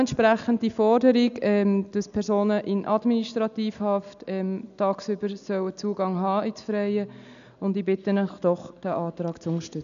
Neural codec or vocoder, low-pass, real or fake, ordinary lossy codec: none; 7.2 kHz; real; none